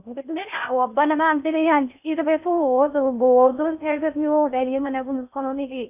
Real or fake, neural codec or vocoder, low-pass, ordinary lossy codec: fake; codec, 16 kHz in and 24 kHz out, 0.6 kbps, FocalCodec, streaming, 4096 codes; 3.6 kHz; none